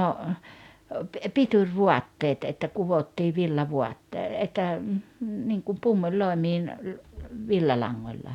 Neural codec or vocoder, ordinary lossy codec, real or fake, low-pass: none; none; real; 19.8 kHz